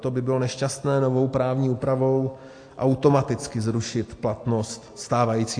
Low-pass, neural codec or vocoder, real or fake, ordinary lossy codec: 9.9 kHz; none; real; AAC, 48 kbps